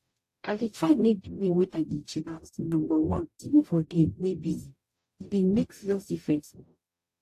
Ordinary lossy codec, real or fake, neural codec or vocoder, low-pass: AAC, 64 kbps; fake; codec, 44.1 kHz, 0.9 kbps, DAC; 14.4 kHz